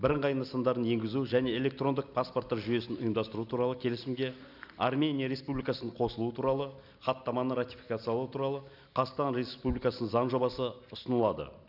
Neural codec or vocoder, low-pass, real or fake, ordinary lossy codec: none; 5.4 kHz; real; none